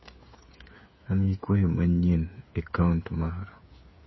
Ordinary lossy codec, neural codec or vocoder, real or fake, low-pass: MP3, 24 kbps; codec, 16 kHz, 8 kbps, FreqCodec, smaller model; fake; 7.2 kHz